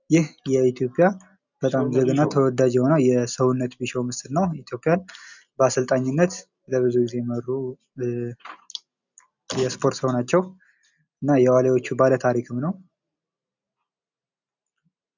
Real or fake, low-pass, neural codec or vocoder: real; 7.2 kHz; none